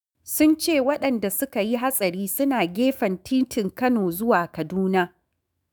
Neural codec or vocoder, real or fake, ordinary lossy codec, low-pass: autoencoder, 48 kHz, 128 numbers a frame, DAC-VAE, trained on Japanese speech; fake; none; none